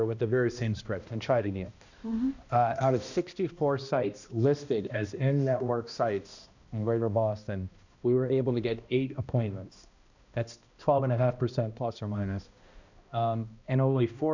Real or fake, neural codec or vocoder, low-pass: fake; codec, 16 kHz, 1 kbps, X-Codec, HuBERT features, trained on balanced general audio; 7.2 kHz